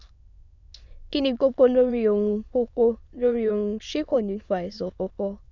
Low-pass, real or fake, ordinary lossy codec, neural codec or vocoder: 7.2 kHz; fake; none; autoencoder, 22.05 kHz, a latent of 192 numbers a frame, VITS, trained on many speakers